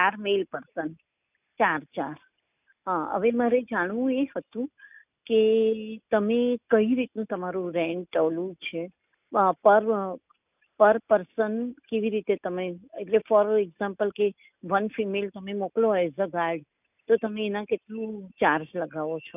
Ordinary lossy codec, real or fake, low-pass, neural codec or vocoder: none; real; 3.6 kHz; none